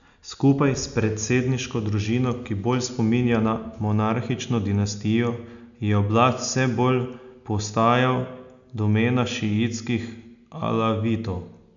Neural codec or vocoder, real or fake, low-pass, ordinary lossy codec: none; real; 7.2 kHz; none